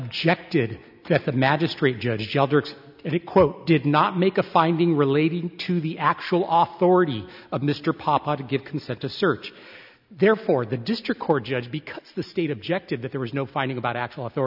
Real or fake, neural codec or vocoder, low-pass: real; none; 5.4 kHz